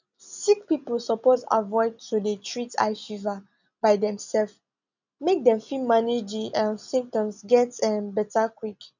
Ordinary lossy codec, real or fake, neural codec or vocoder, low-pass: none; real; none; 7.2 kHz